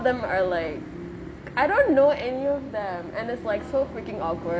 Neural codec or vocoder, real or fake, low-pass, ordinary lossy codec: none; real; none; none